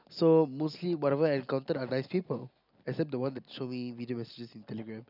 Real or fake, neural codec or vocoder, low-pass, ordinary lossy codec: real; none; 5.4 kHz; none